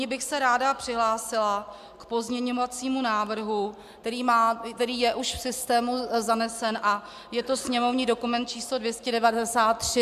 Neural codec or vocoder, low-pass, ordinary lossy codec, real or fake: none; 14.4 kHz; MP3, 96 kbps; real